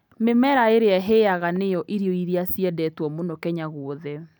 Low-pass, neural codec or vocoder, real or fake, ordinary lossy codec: 19.8 kHz; none; real; none